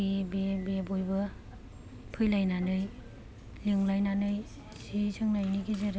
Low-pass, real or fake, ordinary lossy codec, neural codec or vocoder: none; real; none; none